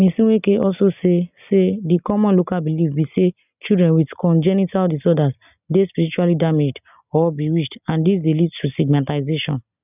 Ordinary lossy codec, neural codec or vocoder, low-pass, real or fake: none; none; 3.6 kHz; real